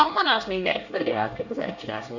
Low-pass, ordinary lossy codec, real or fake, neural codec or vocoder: 7.2 kHz; none; fake; codec, 24 kHz, 1 kbps, SNAC